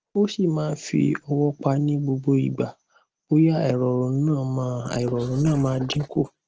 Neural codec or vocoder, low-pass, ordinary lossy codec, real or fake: none; 7.2 kHz; Opus, 16 kbps; real